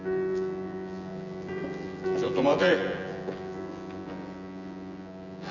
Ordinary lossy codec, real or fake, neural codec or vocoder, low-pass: none; fake; vocoder, 24 kHz, 100 mel bands, Vocos; 7.2 kHz